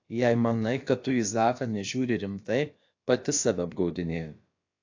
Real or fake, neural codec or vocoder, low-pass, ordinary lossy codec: fake; codec, 16 kHz, about 1 kbps, DyCAST, with the encoder's durations; 7.2 kHz; AAC, 48 kbps